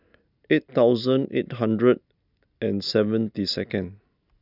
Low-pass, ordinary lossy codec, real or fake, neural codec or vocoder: 5.4 kHz; none; real; none